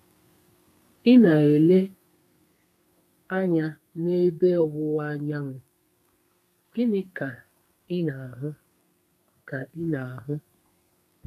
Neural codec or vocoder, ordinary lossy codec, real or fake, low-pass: codec, 32 kHz, 1.9 kbps, SNAC; none; fake; 14.4 kHz